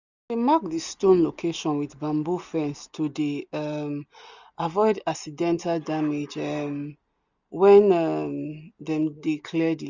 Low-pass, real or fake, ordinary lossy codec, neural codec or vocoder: 7.2 kHz; real; none; none